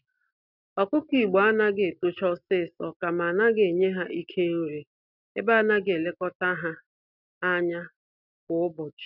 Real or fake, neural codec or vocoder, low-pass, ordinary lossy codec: real; none; 5.4 kHz; none